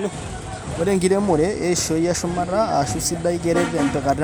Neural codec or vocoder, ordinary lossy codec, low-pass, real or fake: none; none; none; real